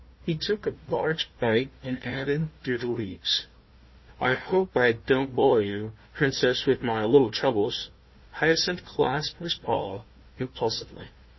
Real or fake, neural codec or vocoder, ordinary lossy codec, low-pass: fake; codec, 16 kHz, 1 kbps, FunCodec, trained on Chinese and English, 50 frames a second; MP3, 24 kbps; 7.2 kHz